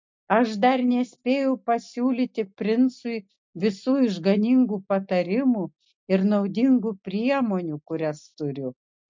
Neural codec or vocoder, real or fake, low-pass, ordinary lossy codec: none; real; 7.2 kHz; MP3, 48 kbps